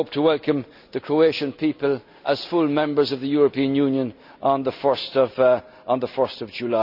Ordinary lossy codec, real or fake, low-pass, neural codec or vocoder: none; real; 5.4 kHz; none